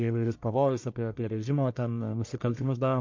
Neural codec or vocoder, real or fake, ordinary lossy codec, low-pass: codec, 44.1 kHz, 1.7 kbps, Pupu-Codec; fake; MP3, 48 kbps; 7.2 kHz